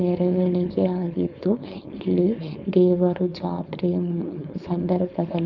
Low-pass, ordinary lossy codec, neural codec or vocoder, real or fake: 7.2 kHz; none; codec, 16 kHz, 4.8 kbps, FACodec; fake